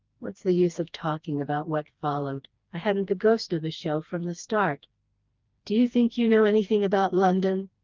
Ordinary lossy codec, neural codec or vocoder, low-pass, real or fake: Opus, 32 kbps; codec, 16 kHz, 2 kbps, FreqCodec, smaller model; 7.2 kHz; fake